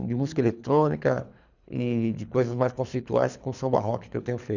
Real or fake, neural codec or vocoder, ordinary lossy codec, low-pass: fake; codec, 24 kHz, 3 kbps, HILCodec; none; 7.2 kHz